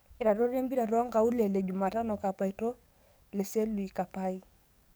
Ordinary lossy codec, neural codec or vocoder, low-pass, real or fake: none; codec, 44.1 kHz, 7.8 kbps, DAC; none; fake